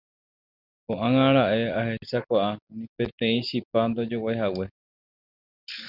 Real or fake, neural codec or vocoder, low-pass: real; none; 5.4 kHz